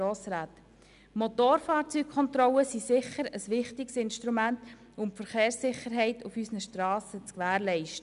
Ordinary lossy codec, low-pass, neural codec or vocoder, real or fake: none; 10.8 kHz; none; real